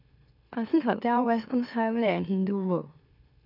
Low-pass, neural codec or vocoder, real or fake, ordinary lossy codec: 5.4 kHz; autoencoder, 44.1 kHz, a latent of 192 numbers a frame, MeloTTS; fake; none